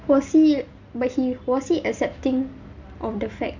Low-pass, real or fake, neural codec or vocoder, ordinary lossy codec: 7.2 kHz; real; none; Opus, 64 kbps